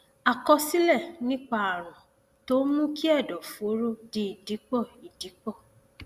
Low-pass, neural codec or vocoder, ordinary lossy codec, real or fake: 14.4 kHz; none; Opus, 64 kbps; real